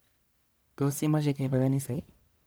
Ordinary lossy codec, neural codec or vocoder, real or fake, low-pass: none; codec, 44.1 kHz, 1.7 kbps, Pupu-Codec; fake; none